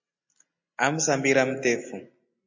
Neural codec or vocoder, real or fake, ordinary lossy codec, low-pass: none; real; MP3, 48 kbps; 7.2 kHz